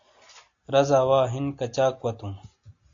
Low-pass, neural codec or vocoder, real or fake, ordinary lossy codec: 7.2 kHz; none; real; AAC, 48 kbps